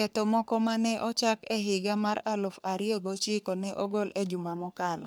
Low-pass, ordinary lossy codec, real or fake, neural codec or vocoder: none; none; fake; codec, 44.1 kHz, 3.4 kbps, Pupu-Codec